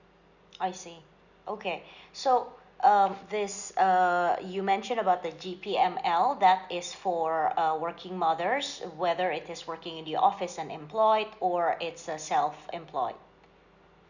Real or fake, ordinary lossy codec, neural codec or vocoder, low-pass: real; none; none; 7.2 kHz